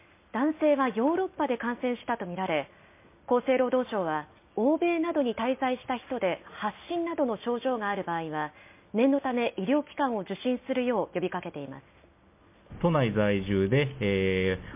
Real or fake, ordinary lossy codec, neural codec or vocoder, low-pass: real; MP3, 24 kbps; none; 3.6 kHz